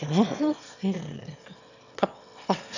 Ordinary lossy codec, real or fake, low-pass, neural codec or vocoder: none; fake; 7.2 kHz; autoencoder, 22.05 kHz, a latent of 192 numbers a frame, VITS, trained on one speaker